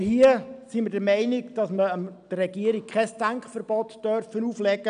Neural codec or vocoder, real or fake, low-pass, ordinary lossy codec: none; real; 9.9 kHz; none